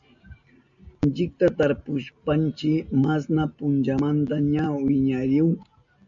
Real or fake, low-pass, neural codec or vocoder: real; 7.2 kHz; none